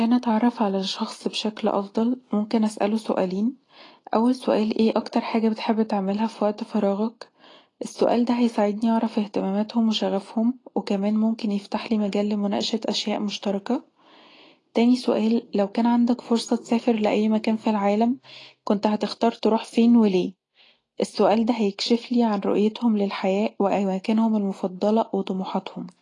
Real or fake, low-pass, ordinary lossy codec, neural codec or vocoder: real; 10.8 kHz; AAC, 32 kbps; none